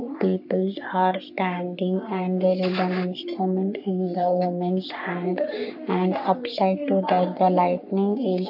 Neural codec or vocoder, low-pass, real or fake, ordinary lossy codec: codec, 44.1 kHz, 3.4 kbps, Pupu-Codec; 5.4 kHz; fake; none